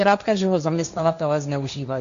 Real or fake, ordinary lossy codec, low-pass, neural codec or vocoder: fake; MP3, 64 kbps; 7.2 kHz; codec, 16 kHz, 1.1 kbps, Voila-Tokenizer